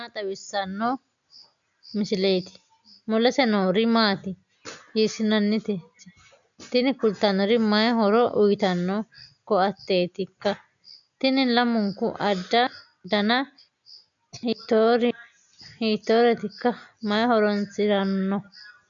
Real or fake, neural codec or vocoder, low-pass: real; none; 7.2 kHz